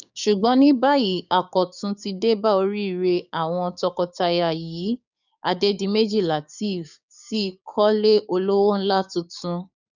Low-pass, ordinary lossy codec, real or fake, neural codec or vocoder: 7.2 kHz; none; fake; codec, 16 kHz, 6 kbps, DAC